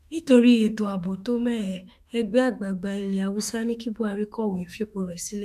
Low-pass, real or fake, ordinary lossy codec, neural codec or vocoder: 14.4 kHz; fake; none; autoencoder, 48 kHz, 32 numbers a frame, DAC-VAE, trained on Japanese speech